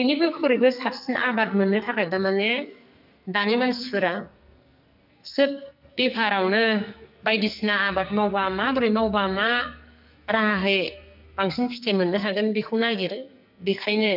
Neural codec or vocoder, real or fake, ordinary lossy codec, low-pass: codec, 44.1 kHz, 2.6 kbps, SNAC; fake; none; 5.4 kHz